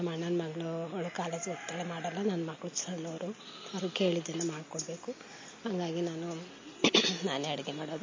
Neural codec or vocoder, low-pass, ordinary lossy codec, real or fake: none; 7.2 kHz; MP3, 32 kbps; real